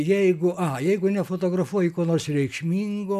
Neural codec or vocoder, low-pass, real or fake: none; 14.4 kHz; real